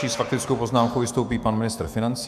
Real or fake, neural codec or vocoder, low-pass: fake; autoencoder, 48 kHz, 128 numbers a frame, DAC-VAE, trained on Japanese speech; 14.4 kHz